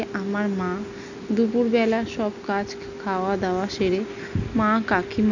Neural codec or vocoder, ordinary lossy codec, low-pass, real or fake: none; none; 7.2 kHz; real